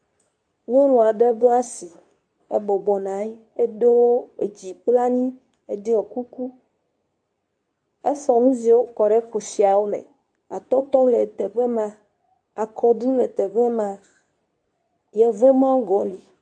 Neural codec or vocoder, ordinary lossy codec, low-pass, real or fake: codec, 24 kHz, 0.9 kbps, WavTokenizer, medium speech release version 2; AAC, 64 kbps; 9.9 kHz; fake